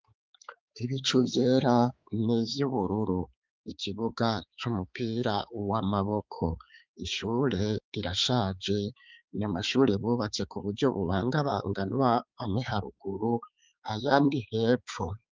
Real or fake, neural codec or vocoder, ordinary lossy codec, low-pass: fake; codec, 16 kHz, 4 kbps, X-Codec, HuBERT features, trained on balanced general audio; Opus, 24 kbps; 7.2 kHz